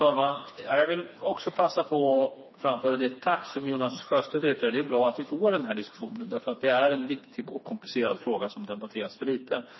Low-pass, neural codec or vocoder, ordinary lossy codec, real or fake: 7.2 kHz; codec, 16 kHz, 2 kbps, FreqCodec, smaller model; MP3, 24 kbps; fake